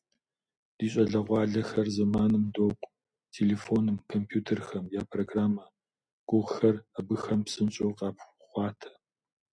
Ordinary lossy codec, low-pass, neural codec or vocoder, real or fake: MP3, 96 kbps; 9.9 kHz; none; real